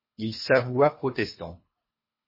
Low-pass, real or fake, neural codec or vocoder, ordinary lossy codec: 5.4 kHz; fake; codec, 24 kHz, 3 kbps, HILCodec; MP3, 24 kbps